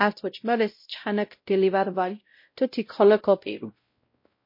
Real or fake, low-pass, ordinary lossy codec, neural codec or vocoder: fake; 5.4 kHz; MP3, 32 kbps; codec, 16 kHz, 0.5 kbps, X-Codec, WavLM features, trained on Multilingual LibriSpeech